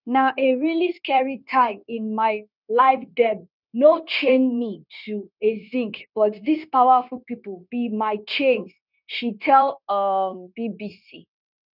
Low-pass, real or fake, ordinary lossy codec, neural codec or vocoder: 5.4 kHz; fake; none; codec, 16 kHz, 0.9 kbps, LongCat-Audio-Codec